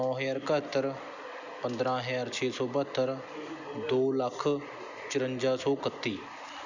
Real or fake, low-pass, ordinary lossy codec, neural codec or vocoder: real; 7.2 kHz; none; none